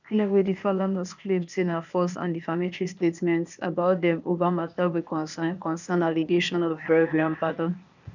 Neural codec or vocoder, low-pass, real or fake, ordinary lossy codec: codec, 16 kHz, 0.8 kbps, ZipCodec; 7.2 kHz; fake; none